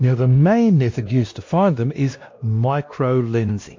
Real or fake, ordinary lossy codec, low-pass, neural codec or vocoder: fake; MP3, 48 kbps; 7.2 kHz; codec, 16 kHz, 1 kbps, X-Codec, WavLM features, trained on Multilingual LibriSpeech